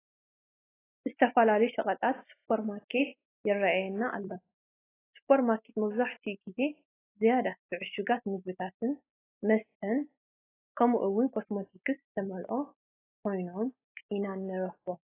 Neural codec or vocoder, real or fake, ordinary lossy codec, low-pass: none; real; AAC, 16 kbps; 3.6 kHz